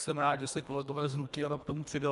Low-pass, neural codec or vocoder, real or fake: 10.8 kHz; codec, 24 kHz, 1.5 kbps, HILCodec; fake